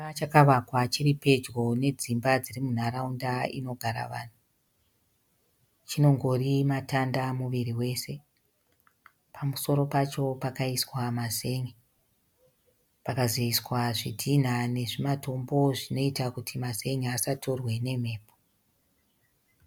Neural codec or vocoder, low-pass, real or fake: none; 19.8 kHz; real